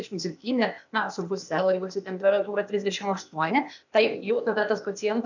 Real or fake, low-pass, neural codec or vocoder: fake; 7.2 kHz; codec, 16 kHz, 0.8 kbps, ZipCodec